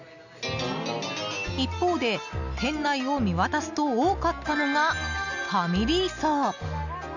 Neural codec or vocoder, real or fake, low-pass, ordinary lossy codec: none; real; 7.2 kHz; none